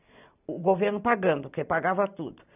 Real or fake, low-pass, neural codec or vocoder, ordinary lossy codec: real; 3.6 kHz; none; none